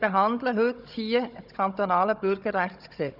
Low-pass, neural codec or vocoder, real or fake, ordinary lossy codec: 5.4 kHz; codec, 16 kHz, 16 kbps, FunCodec, trained on Chinese and English, 50 frames a second; fake; none